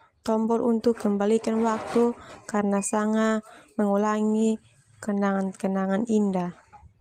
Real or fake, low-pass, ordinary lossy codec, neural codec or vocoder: real; 14.4 kHz; Opus, 24 kbps; none